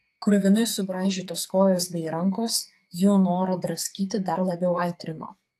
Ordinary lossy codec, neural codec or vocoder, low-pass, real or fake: AAC, 64 kbps; codec, 44.1 kHz, 2.6 kbps, SNAC; 14.4 kHz; fake